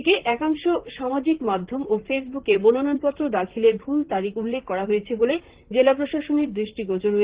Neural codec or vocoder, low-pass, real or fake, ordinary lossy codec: vocoder, 44.1 kHz, 128 mel bands, Pupu-Vocoder; 3.6 kHz; fake; Opus, 16 kbps